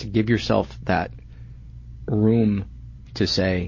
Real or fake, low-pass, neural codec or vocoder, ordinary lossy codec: real; 7.2 kHz; none; MP3, 32 kbps